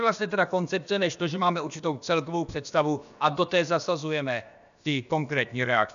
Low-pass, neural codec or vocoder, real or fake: 7.2 kHz; codec, 16 kHz, about 1 kbps, DyCAST, with the encoder's durations; fake